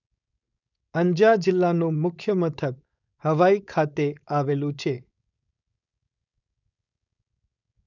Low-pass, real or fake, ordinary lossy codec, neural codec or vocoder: 7.2 kHz; fake; none; codec, 16 kHz, 4.8 kbps, FACodec